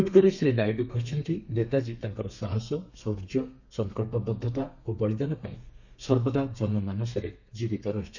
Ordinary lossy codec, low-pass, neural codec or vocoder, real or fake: none; 7.2 kHz; codec, 32 kHz, 1.9 kbps, SNAC; fake